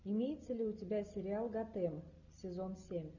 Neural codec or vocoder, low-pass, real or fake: none; 7.2 kHz; real